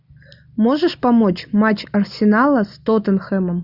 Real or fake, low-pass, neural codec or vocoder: real; 5.4 kHz; none